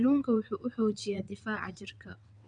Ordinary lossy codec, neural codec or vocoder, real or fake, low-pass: none; vocoder, 22.05 kHz, 80 mel bands, WaveNeXt; fake; 9.9 kHz